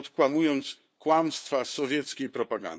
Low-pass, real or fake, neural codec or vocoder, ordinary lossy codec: none; fake; codec, 16 kHz, 2 kbps, FunCodec, trained on LibriTTS, 25 frames a second; none